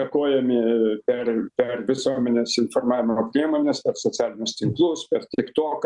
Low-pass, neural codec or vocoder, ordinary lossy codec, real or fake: 10.8 kHz; none; Opus, 64 kbps; real